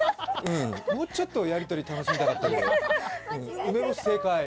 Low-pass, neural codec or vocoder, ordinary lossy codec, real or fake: none; none; none; real